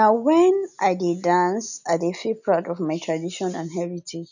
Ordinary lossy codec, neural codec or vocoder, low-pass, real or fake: none; none; 7.2 kHz; real